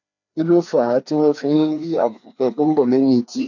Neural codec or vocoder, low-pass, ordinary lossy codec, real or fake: codec, 16 kHz, 2 kbps, FreqCodec, larger model; 7.2 kHz; none; fake